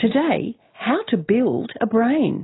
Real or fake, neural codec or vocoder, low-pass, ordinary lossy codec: real; none; 7.2 kHz; AAC, 16 kbps